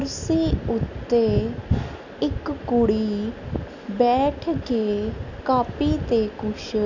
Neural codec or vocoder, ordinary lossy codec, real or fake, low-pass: none; none; real; 7.2 kHz